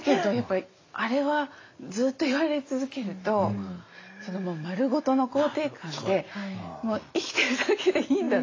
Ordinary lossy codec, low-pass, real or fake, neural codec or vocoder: AAC, 32 kbps; 7.2 kHz; real; none